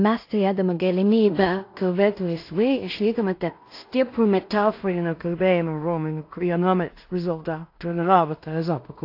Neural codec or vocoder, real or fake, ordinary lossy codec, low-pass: codec, 16 kHz in and 24 kHz out, 0.4 kbps, LongCat-Audio-Codec, two codebook decoder; fake; AAC, 32 kbps; 5.4 kHz